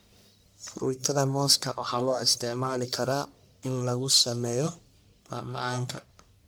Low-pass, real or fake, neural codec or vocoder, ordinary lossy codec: none; fake; codec, 44.1 kHz, 1.7 kbps, Pupu-Codec; none